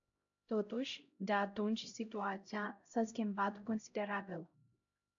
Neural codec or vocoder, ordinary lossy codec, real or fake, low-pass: codec, 16 kHz, 0.5 kbps, X-Codec, HuBERT features, trained on LibriSpeech; AAC, 64 kbps; fake; 7.2 kHz